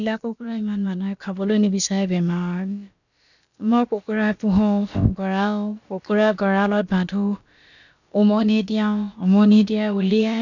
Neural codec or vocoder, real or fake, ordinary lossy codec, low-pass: codec, 16 kHz, about 1 kbps, DyCAST, with the encoder's durations; fake; none; 7.2 kHz